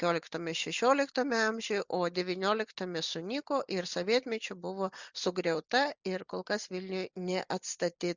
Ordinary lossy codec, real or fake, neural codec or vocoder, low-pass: Opus, 64 kbps; real; none; 7.2 kHz